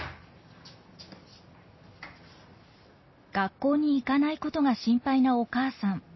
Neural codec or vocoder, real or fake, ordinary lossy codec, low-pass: none; real; MP3, 24 kbps; 7.2 kHz